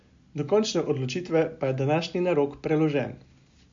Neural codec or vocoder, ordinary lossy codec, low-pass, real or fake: none; AAC, 64 kbps; 7.2 kHz; real